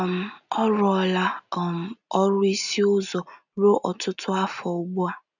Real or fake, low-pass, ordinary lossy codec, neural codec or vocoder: real; 7.2 kHz; none; none